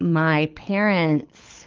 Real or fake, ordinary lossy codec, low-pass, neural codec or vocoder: fake; Opus, 24 kbps; 7.2 kHz; codec, 24 kHz, 6 kbps, HILCodec